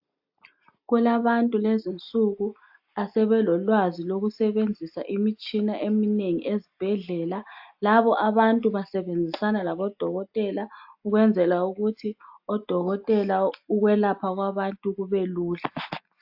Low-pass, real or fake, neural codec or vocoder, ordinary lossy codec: 5.4 kHz; real; none; AAC, 48 kbps